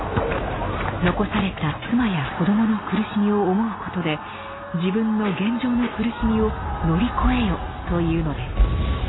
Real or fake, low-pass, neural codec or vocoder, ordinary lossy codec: real; 7.2 kHz; none; AAC, 16 kbps